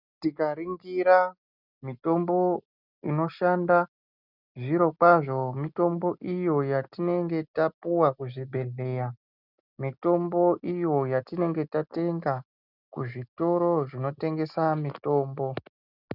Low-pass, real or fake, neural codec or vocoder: 5.4 kHz; real; none